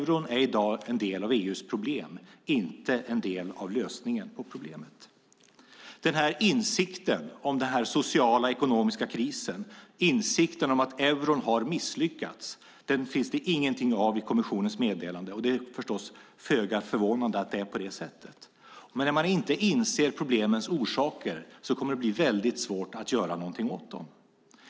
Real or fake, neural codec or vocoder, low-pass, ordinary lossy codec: real; none; none; none